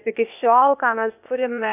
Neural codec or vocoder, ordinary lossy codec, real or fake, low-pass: codec, 16 kHz, 0.8 kbps, ZipCodec; AAC, 32 kbps; fake; 3.6 kHz